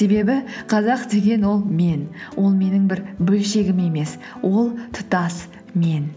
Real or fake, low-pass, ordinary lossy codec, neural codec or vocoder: real; none; none; none